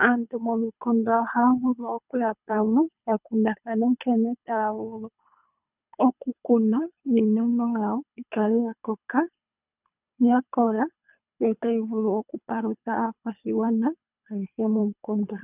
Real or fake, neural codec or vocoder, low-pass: fake; codec, 24 kHz, 3 kbps, HILCodec; 3.6 kHz